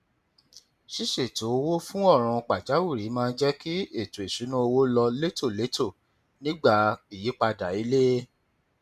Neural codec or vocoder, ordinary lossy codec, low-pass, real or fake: none; none; 14.4 kHz; real